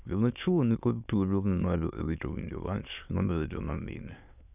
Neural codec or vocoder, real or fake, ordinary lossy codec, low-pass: autoencoder, 22.05 kHz, a latent of 192 numbers a frame, VITS, trained on many speakers; fake; none; 3.6 kHz